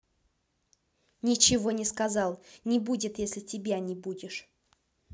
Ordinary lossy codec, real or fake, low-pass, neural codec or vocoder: none; real; none; none